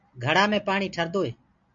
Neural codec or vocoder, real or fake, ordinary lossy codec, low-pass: none; real; MP3, 64 kbps; 7.2 kHz